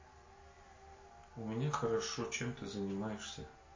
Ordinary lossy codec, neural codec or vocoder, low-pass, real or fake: MP3, 32 kbps; none; 7.2 kHz; real